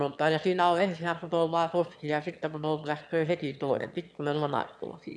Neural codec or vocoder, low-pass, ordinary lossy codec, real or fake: autoencoder, 22.05 kHz, a latent of 192 numbers a frame, VITS, trained on one speaker; none; none; fake